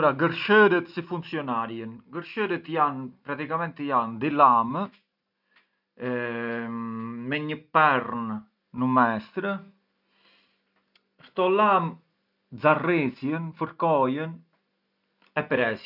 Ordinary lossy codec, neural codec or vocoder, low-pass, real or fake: none; none; 5.4 kHz; real